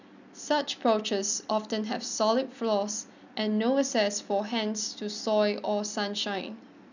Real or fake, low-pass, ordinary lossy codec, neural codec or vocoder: real; 7.2 kHz; none; none